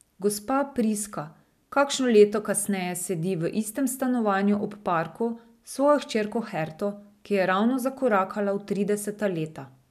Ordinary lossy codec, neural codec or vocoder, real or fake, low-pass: none; none; real; 14.4 kHz